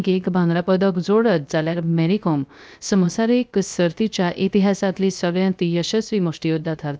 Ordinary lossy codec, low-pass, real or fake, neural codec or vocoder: none; none; fake; codec, 16 kHz, 0.3 kbps, FocalCodec